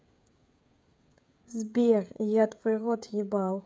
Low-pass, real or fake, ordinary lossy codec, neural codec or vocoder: none; fake; none; codec, 16 kHz, 16 kbps, FreqCodec, smaller model